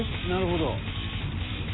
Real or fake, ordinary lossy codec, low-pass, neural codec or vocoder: real; AAC, 16 kbps; 7.2 kHz; none